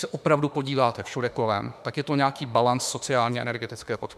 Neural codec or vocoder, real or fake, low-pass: autoencoder, 48 kHz, 32 numbers a frame, DAC-VAE, trained on Japanese speech; fake; 14.4 kHz